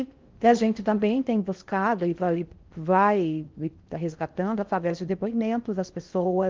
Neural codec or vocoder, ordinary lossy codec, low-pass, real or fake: codec, 16 kHz in and 24 kHz out, 0.6 kbps, FocalCodec, streaming, 4096 codes; Opus, 32 kbps; 7.2 kHz; fake